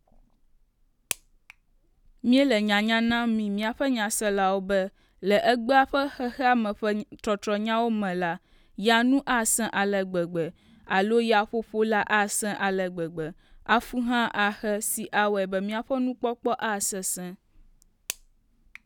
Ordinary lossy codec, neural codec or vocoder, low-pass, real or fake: none; none; 19.8 kHz; real